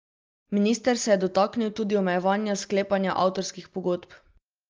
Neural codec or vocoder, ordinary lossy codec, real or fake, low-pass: none; Opus, 32 kbps; real; 7.2 kHz